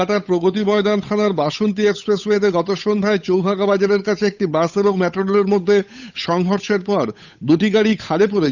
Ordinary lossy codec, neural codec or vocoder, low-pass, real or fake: none; codec, 16 kHz, 8 kbps, FunCodec, trained on Chinese and English, 25 frames a second; 7.2 kHz; fake